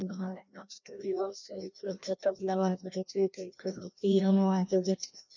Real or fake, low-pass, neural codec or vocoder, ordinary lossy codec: fake; 7.2 kHz; codec, 16 kHz, 1 kbps, FreqCodec, larger model; none